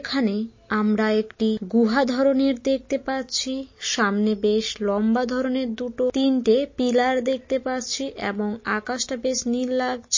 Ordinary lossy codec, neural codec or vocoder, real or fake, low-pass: MP3, 32 kbps; none; real; 7.2 kHz